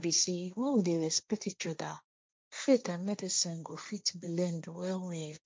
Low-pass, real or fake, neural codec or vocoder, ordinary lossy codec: none; fake; codec, 16 kHz, 1.1 kbps, Voila-Tokenizer; none